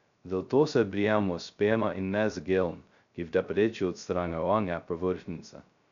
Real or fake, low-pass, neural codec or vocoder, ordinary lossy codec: fake; 7.2 kHz; codec, 16 kHz, 0.2 kbps, FocalCodec; none